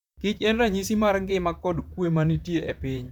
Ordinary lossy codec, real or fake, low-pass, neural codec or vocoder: none; fake; 19.8 kHz; vocoder, 44.1 kHz, 128 mel bands, Pupu-Vocoder